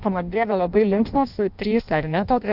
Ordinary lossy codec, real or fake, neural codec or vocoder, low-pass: Opus, 64 kbps; fake; codec, 16 kHz in and 24 kHz out, 0.6 kbps, FireRedTTS-2 codec; 5.4 kHz